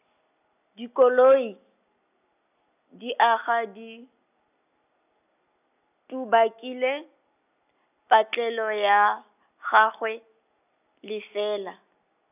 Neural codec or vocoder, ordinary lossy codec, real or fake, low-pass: none; none; real; 3.6 kHz